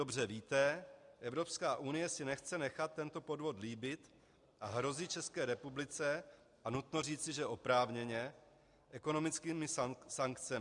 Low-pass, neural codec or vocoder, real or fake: 10.8 kHz; none; real